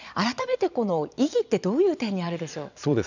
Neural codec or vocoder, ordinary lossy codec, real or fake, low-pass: vocoder, 22.05 kHz, 80 mel bands, Vocos; none; fake; 7.2 kHz